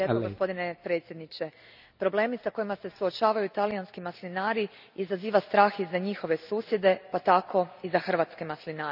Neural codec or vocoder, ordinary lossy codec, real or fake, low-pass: none; none; real; 5.4 kHz